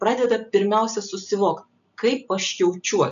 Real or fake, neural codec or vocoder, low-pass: real; none; 7.2 kHz